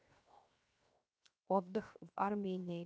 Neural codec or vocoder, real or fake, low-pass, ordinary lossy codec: codec, 16 kHz, 0.7 kbps, FocalCodec; fake; none; none